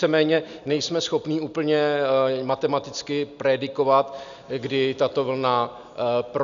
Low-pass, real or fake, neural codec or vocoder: 7.2 kHz; real; none